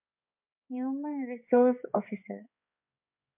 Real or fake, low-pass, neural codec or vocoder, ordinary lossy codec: fake; 3.6 kHz; codec, 16 kHz, 4 kbps, X-Codec, HuBERT features, trained on balanced general audio; MP3, 32 kbps